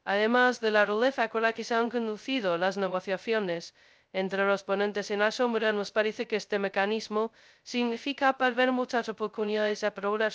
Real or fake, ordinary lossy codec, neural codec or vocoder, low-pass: fake; none; codec, 16 kHz, 0.2 kbps, FocalCodec; none